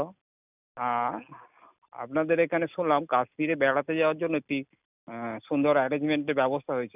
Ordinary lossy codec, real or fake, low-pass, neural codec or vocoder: none; real; 3.6 kHz; none